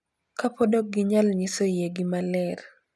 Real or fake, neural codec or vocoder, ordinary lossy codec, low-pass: real; none; none; none